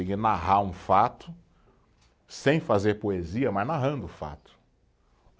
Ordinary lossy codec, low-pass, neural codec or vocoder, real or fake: none; none; none; real